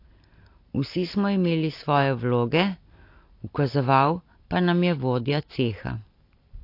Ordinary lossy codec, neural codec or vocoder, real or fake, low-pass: AAC, 32 kbps; none; real; 5.4 kHz